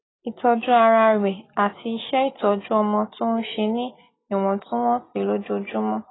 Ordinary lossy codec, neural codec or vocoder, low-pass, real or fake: AAC, 16 kbps; none; 7.2 kHz; real